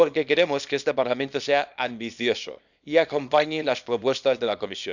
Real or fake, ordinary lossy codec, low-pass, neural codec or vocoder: fake; none; 7.2 kHz; codec, 24 kHz, 0.9 kbps, WavTokenizer, small release